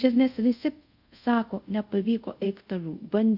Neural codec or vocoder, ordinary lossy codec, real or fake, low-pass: codec, 24 kHz, 0.5 kbps, DualCodec; Opus, 64 kbps; fake; 5.4 kHz